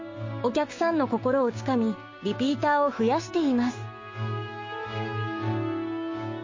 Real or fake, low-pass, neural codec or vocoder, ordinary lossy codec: fake; 7.2 kHz; codec, 16 kHz, 6 kbps, DAC; MP3, 32 kbps